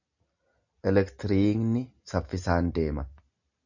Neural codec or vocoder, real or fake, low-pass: none; real; 7.2 kHz